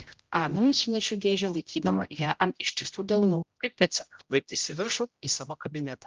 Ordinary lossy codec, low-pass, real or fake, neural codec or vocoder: Opus, 32 kbps; 7.2 kHz; fake; codec, 16 kHz, 0.5 kbps, X-Codec, HuBERT features, trained on general audio